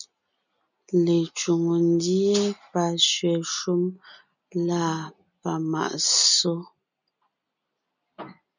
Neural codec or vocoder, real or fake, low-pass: none; real; 7.2 kHz